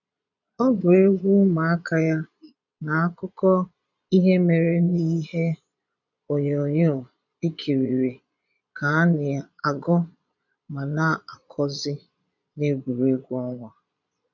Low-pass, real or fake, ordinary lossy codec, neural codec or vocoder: 7.2 kHz; fake; none; vocoder, 22.05 kHz, 80 mel bands, Vocos